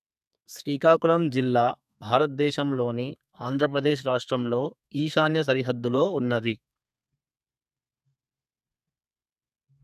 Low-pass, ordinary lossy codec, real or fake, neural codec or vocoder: 14.4 kHz; none; fake; codec, 32 kHz, 1.9 kbps, SNAC